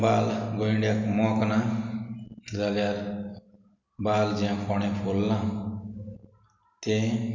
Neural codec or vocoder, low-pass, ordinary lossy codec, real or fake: none; 7.2 kHz; none; real